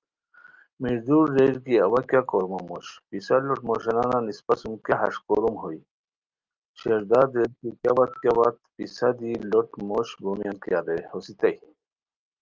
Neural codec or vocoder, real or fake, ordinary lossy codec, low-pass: none; real; Opus, 24 kbps; 7.2 kHz